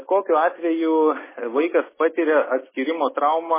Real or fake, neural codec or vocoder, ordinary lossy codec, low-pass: real; none; MP3, 16 kbps; 3.6 kHz